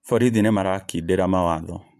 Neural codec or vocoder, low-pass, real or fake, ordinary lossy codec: vocoder, 44.1 kHz, 128 mel bands every 512 samples, BigVGAN v2; 14.4 kHz; fake; MP3, 96 kbps